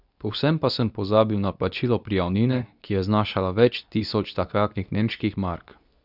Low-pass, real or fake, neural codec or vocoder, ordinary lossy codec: 5.4 kHz; fake; codec, 24 kHz, 0.9 kbps, WavTokenizer, medium speech release version 2; none